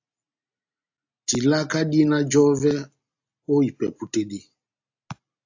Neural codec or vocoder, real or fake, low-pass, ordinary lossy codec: none; real; 7.2 kHz; AAC, 48 kbps